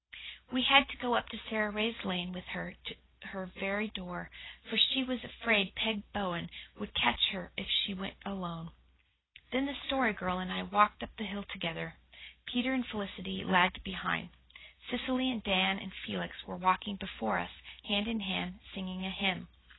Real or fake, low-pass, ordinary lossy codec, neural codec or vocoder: real; 7.2 kHz; AAC, 16 kbps; none